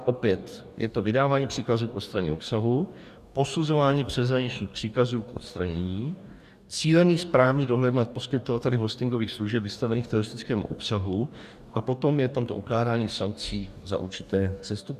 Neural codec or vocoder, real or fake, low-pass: codec, 44.1 kHz, 2.6 kbps, DAC; fake; 14.4 kHz